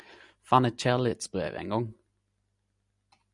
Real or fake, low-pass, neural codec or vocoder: real; 10.8 kHz; none